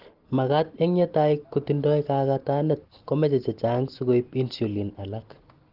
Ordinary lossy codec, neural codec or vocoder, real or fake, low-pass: Opus, 16 kbps; none; real; 5.4 kHz